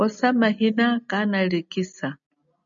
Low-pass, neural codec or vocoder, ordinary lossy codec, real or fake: 7.2 kHz; none; MP3, 96 kbps; real